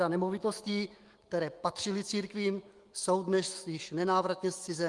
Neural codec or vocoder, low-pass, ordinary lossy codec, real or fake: autoencoder, 48 kHz, 128 numbers a frame, DAC-VAE, trained on Japanese speech; 10.8 kHz; Opus, 24 kbps; fake